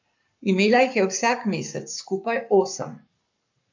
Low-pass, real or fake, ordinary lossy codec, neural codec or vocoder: 7.2 kHz; fake; MP3, 64 kbps; codec, 44.1 kHz, 7.8 kbps, Pupu-Codec